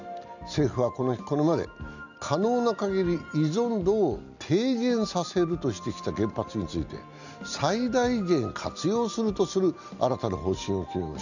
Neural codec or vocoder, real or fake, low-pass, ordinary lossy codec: none; real; 7.2 kHz; none